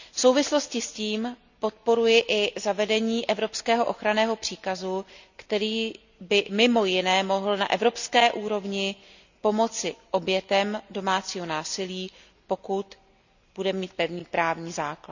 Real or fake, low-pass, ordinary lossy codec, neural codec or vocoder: real; 7.2 kHz; none; none